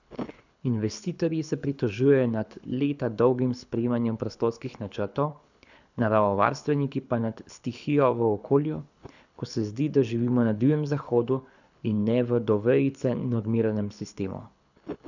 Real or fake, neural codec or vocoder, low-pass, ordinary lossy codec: fake; codec, 24 kHz, 6 kbps, HILCodec; 7.2 kHz; none